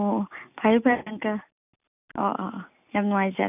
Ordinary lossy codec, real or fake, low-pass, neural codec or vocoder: none; real; 3.6 kHz; none